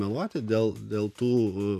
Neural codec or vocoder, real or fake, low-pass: none; real; 14.4 kHz